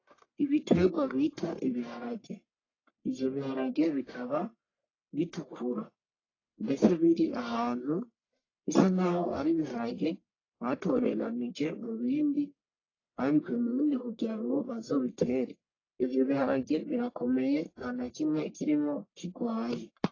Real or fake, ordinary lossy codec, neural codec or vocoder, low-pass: fake; AAC, 32 kbps; codec, 44.1 kHz, 1.7 kbps, Pupu-Codec; 7.2 kHz